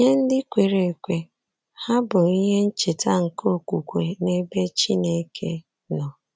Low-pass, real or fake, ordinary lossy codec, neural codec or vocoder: none; real; none; none